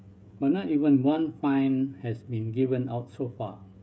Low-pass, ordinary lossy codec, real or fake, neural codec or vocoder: none; none; fake; codec, 16 kHz, 16 kbps, FreqCodec, smaller model